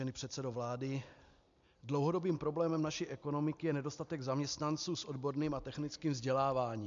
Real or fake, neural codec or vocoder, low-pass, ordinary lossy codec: real; none; 7.2 kHz; MP3, 64 kbps